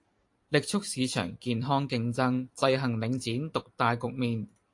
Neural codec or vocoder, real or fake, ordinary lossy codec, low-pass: vocoder, 44.1 kHz, 128 mel bands every 256 samples, BigVGAN v2; fake; AAC, 64 kbps; 10.8 kHz